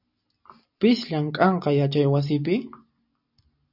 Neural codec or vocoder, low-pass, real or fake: none; 5.4 kHz; real